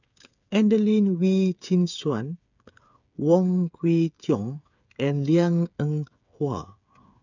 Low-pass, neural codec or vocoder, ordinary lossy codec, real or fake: 7.2 kHz; codec, 16 kHz, 8 kbps, FreqCodec, smaller model; none; fake